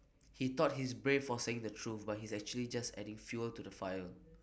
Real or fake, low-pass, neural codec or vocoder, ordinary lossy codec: real; none; none; none